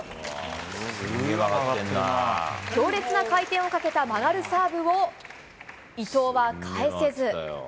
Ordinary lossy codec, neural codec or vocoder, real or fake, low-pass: none; none; real; none